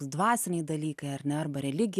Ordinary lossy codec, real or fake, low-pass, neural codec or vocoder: AAC, 96 kbps; real; 14.4 kHz; none